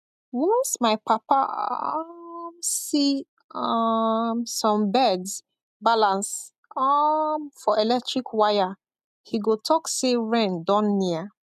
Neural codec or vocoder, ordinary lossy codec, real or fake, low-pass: none; none; real; 14.4 kHz